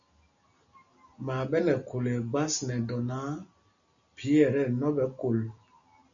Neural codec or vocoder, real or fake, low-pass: none; real; 7.2 kHz